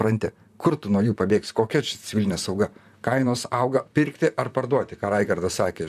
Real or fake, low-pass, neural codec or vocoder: fake; 14.4 kHz; vocoder, 44.1 kHz, 128 mel bands, Pupu-Vocoder